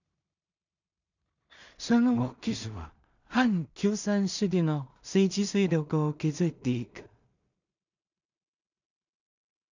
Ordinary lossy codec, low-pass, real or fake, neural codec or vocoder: none; 7.2 kHz; fake; codec, 16 kHz in and 24 kHz out, 0.4 kbps, LongCat-Audio-Codec, two codebook decoder